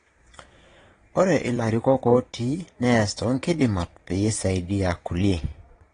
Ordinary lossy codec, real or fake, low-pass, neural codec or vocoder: AAC, 32 kbps; fake; 9.9 kHz; vocoder, 22.05 kHz, 80 mel bands, Vocos